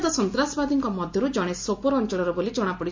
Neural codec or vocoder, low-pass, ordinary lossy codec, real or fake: none; 7.2 kHz; MP3, 48 kbps; real